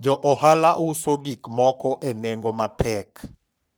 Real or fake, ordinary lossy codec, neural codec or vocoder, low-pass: fake; none; codec, 44.1 kHz, 3.4 kbps, Pupu-Codec; none